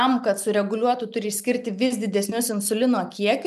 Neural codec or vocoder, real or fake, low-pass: none; real; 14.4 kHz